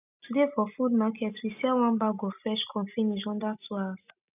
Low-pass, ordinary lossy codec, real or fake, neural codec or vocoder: 3.6 kHz; none; real; none